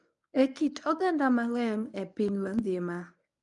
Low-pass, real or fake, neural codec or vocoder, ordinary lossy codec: 10.8 kHz; fake; codec, 24 kHz, 0.9 kbps, WavTokenizer, medium speech release version 1; none